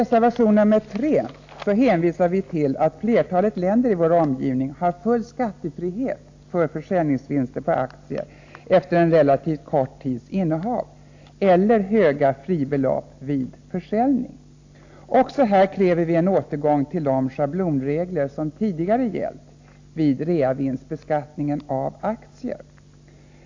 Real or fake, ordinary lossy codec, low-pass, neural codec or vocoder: real; AAC, 48 kbps; 7.2 kHz; none